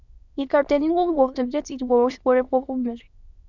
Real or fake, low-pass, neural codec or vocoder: fake; 7.2 kHz; autoencoder, 22.05 kHz, a latent of 192 numbers a frame, VITS, trained on many speakers